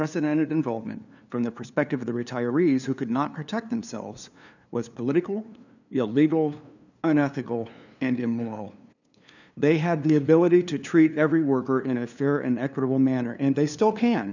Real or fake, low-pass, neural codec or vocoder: fake; 7.2 kHz; codec, 16 kHz, 2 kbps, FunCodec, trained on LibriTTS, 25 frames a second